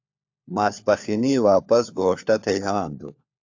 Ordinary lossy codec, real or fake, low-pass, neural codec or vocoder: AAC, 48 kbps; fake; 7.2 kHz; codec, 16 kHz, 4 kbps, FunCodec, trained on LibriTTS, 50 frames a second